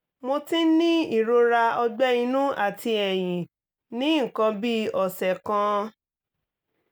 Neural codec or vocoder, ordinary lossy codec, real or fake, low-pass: none; none; real; none